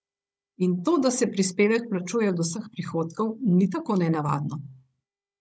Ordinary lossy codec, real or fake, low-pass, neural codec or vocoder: none; fake; none; codec, 16 kHz, 16 kbps, FunCodec, trained on Chinese and English, 50 frames a second